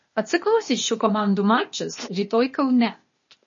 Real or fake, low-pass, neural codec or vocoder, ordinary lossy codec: fake; 7.2 kHz; codec, 16 kHz, 0.8 kbps, ZipCodec; MP3, 32 kbps